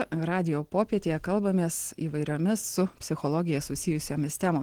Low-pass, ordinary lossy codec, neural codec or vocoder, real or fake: 19.8 kHz; Opus, 16 kbps; none; real